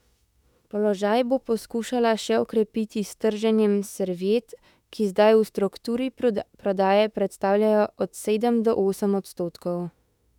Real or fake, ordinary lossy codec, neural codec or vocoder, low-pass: fake; none; autoencoder, 48 kHz, 32 numbers a frame, DAC-VAE, trained on Japanese speech; 19.8 kHz